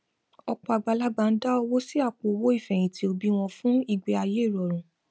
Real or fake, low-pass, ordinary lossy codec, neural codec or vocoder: real; none; none; none